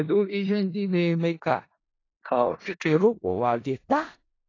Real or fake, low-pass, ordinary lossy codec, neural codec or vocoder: fake; 7.2 kHz; AAC, 32 kbps; codec, 16 kHz in and 24 kHz out, 0.4 kbps, LongCat-Audio-Codec, four codebook decoder